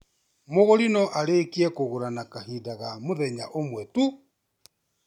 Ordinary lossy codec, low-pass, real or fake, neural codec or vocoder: none; 19.8 kHz; real; none